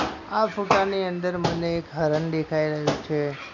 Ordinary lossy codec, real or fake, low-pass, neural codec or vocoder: none; real; 7.2 kHz; none